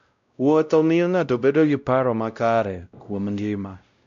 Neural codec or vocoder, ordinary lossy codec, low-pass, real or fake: codec, 16 kHz, 0.5 kbps, X-Codec, WavLM features, trained on Multilingual LibriSpeech; none; 7.2 kHz; fake